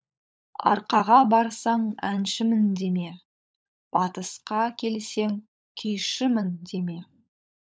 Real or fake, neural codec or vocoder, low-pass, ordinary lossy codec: fake; codec, 16 kHz, 16 kbps, FunCodec, trained on LibriTTS, 50 frames a second; none; none